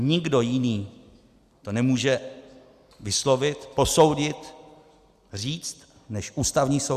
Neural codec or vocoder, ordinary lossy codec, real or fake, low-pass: none; Opus, 64 kbps; real; 14.4 kHz